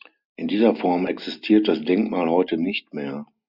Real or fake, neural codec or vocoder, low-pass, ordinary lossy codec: real; none; 5.4 kHz; Opus, 64 kbps